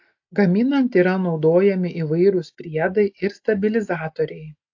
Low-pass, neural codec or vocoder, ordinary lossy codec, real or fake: 7.2 kHz; none; AAC, 48 kbps; real